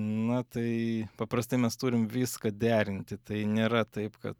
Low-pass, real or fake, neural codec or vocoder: 19.8 kHz; real; none